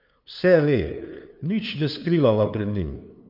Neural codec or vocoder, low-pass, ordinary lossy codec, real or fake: codec, 16 kHz, 2 kbps, FunCodec, trained on LibriTTS, 25 frames a second; 5.4 kHz; none; fake